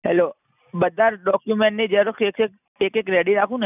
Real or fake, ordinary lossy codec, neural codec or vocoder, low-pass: real; none; none; 3.6 kHz